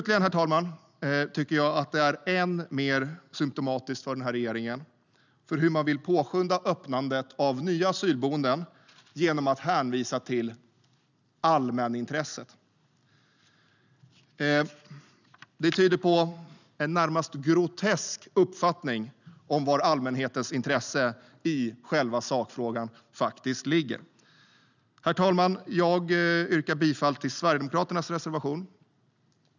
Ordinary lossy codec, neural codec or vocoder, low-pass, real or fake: none; none; 7.2 kHz; real